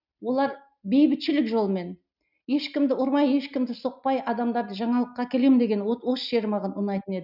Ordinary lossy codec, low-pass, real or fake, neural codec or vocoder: none; 5.4 kHz; real; none